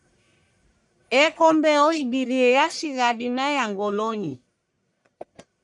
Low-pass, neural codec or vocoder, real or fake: 10.8 kHz; codec, 44.1 kHz, 1.7 kbps, Pupu-Codec; fake